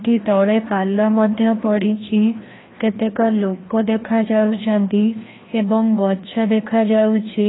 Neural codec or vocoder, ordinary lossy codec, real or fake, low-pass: codec, 16 kHz, 1 kbps, FreqCodec, larger model; AAC, 16 kbps; fake; 7.2 kHz